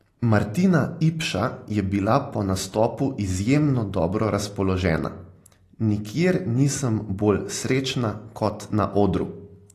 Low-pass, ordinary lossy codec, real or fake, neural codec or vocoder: 14.4 kHz; AAC, 48 kbps; real; none